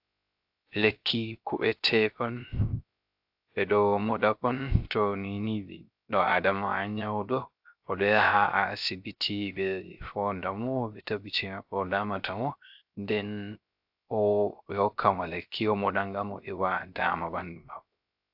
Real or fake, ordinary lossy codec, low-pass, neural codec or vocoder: fake; AAC, 48 kbps; 5.4 kHz; codec, 16 kHz, 0.3 kbps, FocalCodec